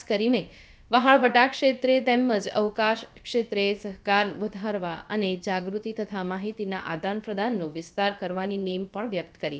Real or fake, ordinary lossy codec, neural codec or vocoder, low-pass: fake; none; codec, 16 kHz, about 1 kbps, DyCAST, with the encoder's durations; none